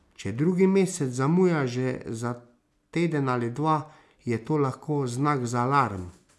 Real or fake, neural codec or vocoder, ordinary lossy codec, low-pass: real; none; none; none